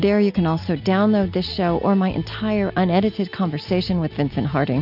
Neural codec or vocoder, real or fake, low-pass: none; real; 5.4 kHz